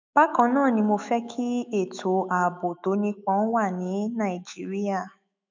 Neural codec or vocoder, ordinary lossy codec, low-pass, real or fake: autoencoder, 48 kHz, 128 numbers a frame, DAC-VAE, trained on Japanese speech; MP3, 64 kbps; 7.2 kHz; fake